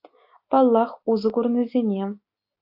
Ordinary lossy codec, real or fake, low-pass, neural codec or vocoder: AAC, 48 kbps; real; 5.4 kHz; none